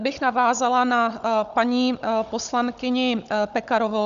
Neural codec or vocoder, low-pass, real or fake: codec, 16 kHz, 16 kbps, FunCodec, trained on Chinese and English, 50 frames a second; 7.2 kHz; fake